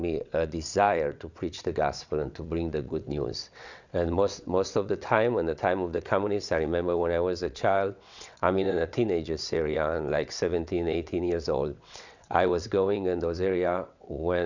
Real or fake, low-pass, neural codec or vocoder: fake; 7.2 kHz; vocoder, 22.05 kHz, 80 mel bands, WaveNeXt